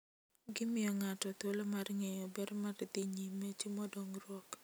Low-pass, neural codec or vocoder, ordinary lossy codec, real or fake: none; none; none; real